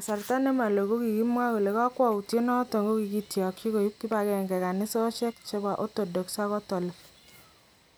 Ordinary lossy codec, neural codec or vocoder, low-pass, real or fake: none; none; none; real